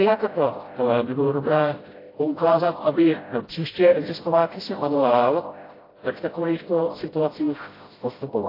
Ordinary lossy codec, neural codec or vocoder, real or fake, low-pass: AAC, 24 kbps; codec, 16 kHz, 0.5 kbps, FreqCodec, smaller model; fake; 5.4 kHz